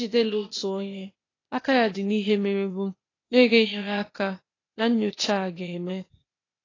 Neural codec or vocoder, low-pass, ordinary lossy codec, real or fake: codec, 16 kHz, 0.8 kbps, ZipCodec; 7.2 kHz; AAC, 32 kbps; fake